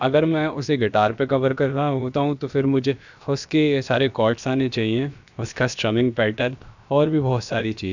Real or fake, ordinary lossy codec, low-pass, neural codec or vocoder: fake; none; 7.2 kHz; codec, 16 kHz, about 1 kbps, DyCAST, with the encoder's durations